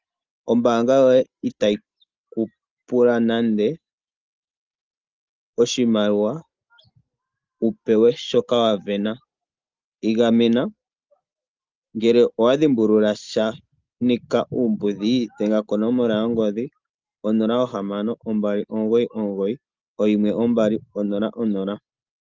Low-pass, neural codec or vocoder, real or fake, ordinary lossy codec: 7.2 kHz; none; real; Opus, 24 kbps